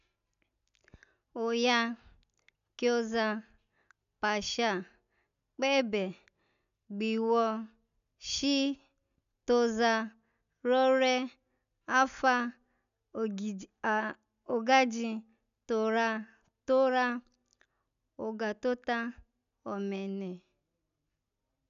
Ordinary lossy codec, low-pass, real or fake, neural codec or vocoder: none; 7.2 kHz; real; none